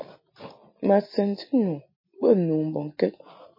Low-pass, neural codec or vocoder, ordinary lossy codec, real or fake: 5.4 kHz; none; MP3, 24 kbps; real